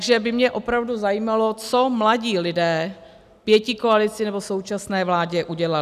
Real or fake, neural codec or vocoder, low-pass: real; none; 14.4 kHz